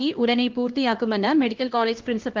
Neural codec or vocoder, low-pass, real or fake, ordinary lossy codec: codec, 16 kHz, 1 kbps, X-Codec, HuBERT features, trained on LibriSpeech; 7.2 kHz; fake; Opus, 16 kbps